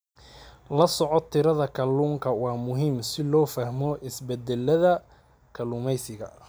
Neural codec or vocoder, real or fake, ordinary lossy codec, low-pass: none; real; none; none